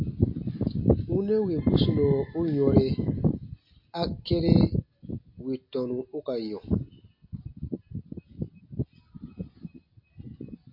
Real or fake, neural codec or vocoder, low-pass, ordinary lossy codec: real; none; 5.4 kHz; MP3, 32 kbps